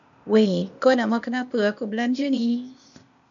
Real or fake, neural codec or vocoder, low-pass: fake; codec, 16 kHz, 0.8 kbps, ZipCodec; 7.2 kHz